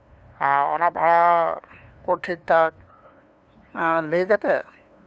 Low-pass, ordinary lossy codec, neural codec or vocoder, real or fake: none; none; codec, 16 kHz, 2 kbps, FunCodec, trained on LibriTTS, 25 frames a second; fake